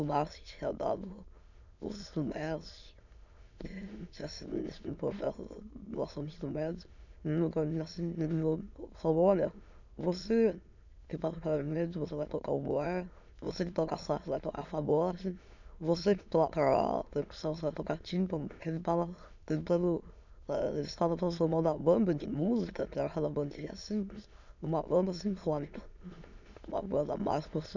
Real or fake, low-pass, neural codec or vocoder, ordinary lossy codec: fake; 7.2 kHz; autoencoder, 22.05 kHz, a latent of 192 numbers a frame, VITS, trained on many speakers; none